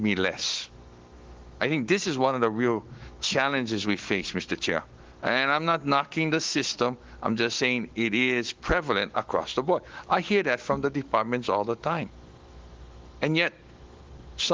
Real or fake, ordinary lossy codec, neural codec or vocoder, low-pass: fake; Opus, 16 kbps; autoencoder, 48 kHz, 128 numbers a frame, DAC-VAE, trained on Japanese speech; 7.2 kHz